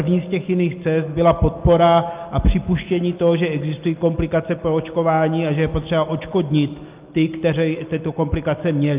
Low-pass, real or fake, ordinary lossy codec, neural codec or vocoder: 3.6 kHz; real; Opus, 24 kbps; none